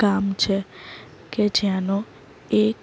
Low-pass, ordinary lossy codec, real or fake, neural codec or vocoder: none; none; real; none